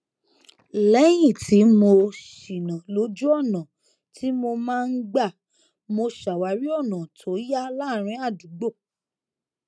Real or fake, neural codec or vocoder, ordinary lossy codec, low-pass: real; none; none; none